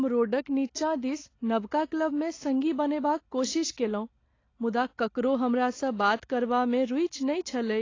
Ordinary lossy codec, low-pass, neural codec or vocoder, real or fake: AAC, 32 kbps; 7.2 kHz; none; real